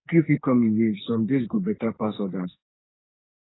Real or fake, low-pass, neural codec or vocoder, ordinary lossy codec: fake; 7.2 kHz; codec, 44.1 kHz, 2.6 kbps, SNAC; AAC, 16 kbps